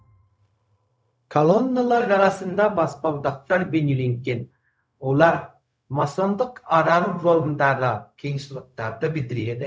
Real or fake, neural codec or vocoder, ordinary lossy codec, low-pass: fake; codec, 16 kHz, 0.4 kbps, LongCat-Audio-Codec; none; none